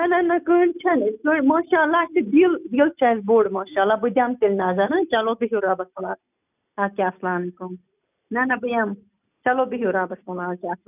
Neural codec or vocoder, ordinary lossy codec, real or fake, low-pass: none; none; real; 3.6 kHz